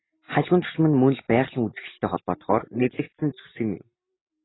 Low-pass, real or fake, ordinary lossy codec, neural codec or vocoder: 7.2 kHz; real; AAC, 16 kbps; none